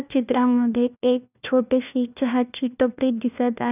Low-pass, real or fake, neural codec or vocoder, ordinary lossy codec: 3.6 kHz; fake; codec, 16 kHz, 1 kbps, FunCodec, trained on LibriTTS, 50 frames a second; none